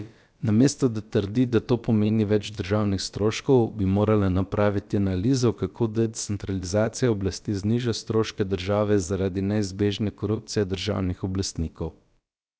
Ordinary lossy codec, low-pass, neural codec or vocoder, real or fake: none; none; codec, 16 kHz, about 1 kbps, DyCAST, with the encoder's durations; fake